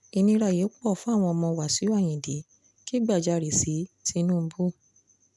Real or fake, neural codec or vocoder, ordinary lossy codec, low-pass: real; none; none; none